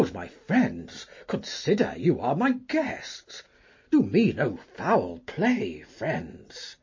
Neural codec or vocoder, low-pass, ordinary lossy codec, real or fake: none; 7.2 kHz; MP3, 32 kbps; real